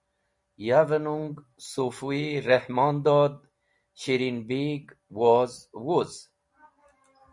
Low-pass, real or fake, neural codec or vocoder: 10.8 kHz; real; none